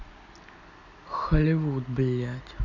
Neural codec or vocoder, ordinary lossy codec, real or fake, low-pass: none; none; real; 7.2 kHz